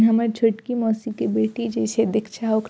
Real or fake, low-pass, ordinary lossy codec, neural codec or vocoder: real; none; none; none